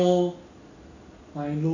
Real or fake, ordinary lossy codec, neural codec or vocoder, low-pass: real; none; none; 7.2 kHz